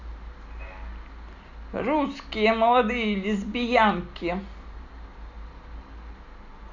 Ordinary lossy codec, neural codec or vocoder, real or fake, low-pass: none; none; real; 7.2 kHz